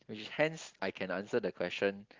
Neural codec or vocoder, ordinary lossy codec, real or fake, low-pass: none; Opus, 32 kbps; real; 7.2 kHz